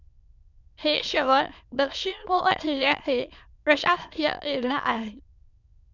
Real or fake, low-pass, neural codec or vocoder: fake; 7.2 kHz; autoencoder, 22.05 kHz, a latent of 192 numbers a frame, VITS, trained on many speakers